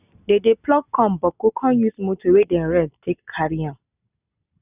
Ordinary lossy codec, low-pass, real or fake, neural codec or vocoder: none; 3.6 kHz; real; none